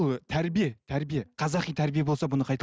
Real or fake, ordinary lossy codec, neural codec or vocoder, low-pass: real; none; none; none